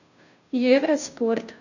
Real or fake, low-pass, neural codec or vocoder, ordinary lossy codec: fake; 7.2 kHz; codec, 16 kHz, 0.5 kbps, FunCodec, trained on Chinese and English, 25 frames a second; none